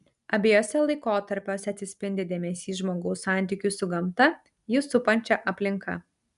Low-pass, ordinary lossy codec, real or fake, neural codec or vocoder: 10.8 kHz; MP3, 96 kbps; real; none